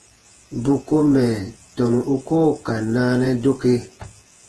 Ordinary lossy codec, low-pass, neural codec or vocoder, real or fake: Opus, 16 kbps; 10.8 kHz; vocoder, 48 kHz, 128 mel bands, Vocos; fake